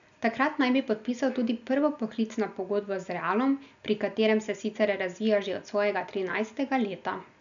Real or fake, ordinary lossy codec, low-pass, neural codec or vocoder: real; none; 7.2 kHz; none